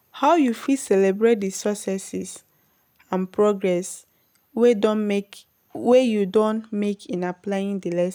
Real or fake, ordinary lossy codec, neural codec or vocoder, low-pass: real; none; none; none